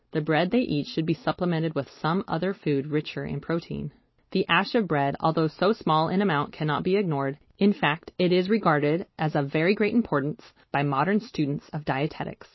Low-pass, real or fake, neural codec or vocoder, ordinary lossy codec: 7.2 kHz; real; none; MP3, 24 kbps